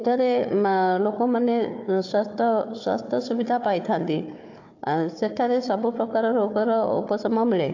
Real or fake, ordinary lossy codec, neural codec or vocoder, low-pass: fake; AAC, 48 kbps; codec, 16 kHz, 4 kbps, FunCodec, trained on Chinese and English, 50 frames a second; 7.2 kHz